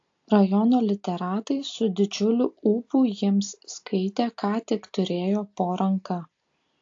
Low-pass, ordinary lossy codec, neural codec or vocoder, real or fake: 7.2 kHz; AAC, 48 kbps; none; real